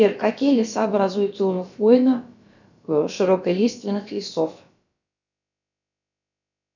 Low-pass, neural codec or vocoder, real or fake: 7.2 kHz; codec, 16 kHz, about 1 kbps, DyCAST, with the encoder's durations; fake